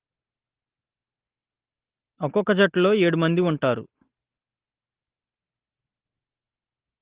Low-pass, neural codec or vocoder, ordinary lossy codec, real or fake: 3.6 kHz; none; Opus, 16 kbps; real